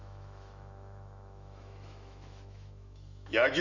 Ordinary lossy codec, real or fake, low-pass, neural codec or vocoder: none; fake; 7.2 kHz; autoencoder, 48 kHz, 128 numbers a frame, DAC-VAE, trained on Japanese speech